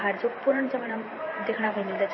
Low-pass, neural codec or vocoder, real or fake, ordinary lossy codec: 7.2 kHz; vocoder, 44.1 kHz, 128 mel bands every 512 samples, BigVGAN v2; fake; MP3, 24 kbps